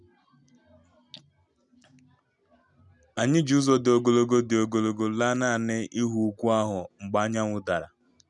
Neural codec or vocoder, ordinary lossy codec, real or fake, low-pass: none; none; real; 10.8 kHz